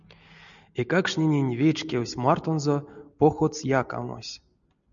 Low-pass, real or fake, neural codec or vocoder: 7.2 kHz; real; none